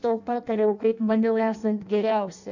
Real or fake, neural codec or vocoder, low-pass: fake; codec, 16 kHz in and 24 kHz out, 0.6 kbps, FireRedTTS-2 codec; 7.2 kHz